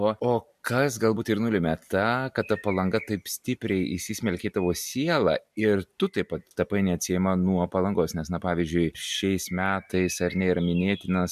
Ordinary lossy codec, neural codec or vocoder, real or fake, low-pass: MP3, 96 kbps; none; real; 14.4 kHz